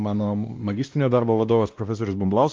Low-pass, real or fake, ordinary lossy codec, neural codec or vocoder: 7.2 kHz; fake; Opus, 32 kbps; codec, 16 kHz, 1 kbps, X-Codec, WavLM features, trained on Multilingual LibriSpeech